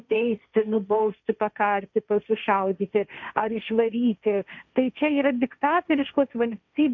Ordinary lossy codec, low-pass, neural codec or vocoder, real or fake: MP3, 64 kbps; 7.2 kHz; codec, 16 kHz, 1.1 kbps, Voila-Tokenizer; fake